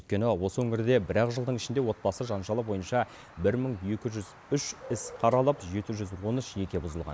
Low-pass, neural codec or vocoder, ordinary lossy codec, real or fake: none; none; none; real